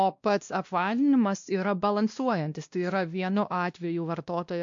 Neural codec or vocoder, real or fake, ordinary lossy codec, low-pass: codec, 16 kHz, 1 kbps, X-Codec, WavLM features, trained on Multilingual LibriSpeech; fake; AAC, 64 kbps; 7.2 kHz